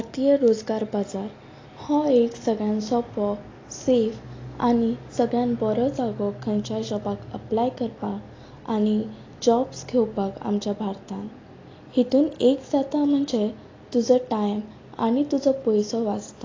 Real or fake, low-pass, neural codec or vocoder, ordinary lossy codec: real; 7.2 kHz; none; AAC, 32 kbps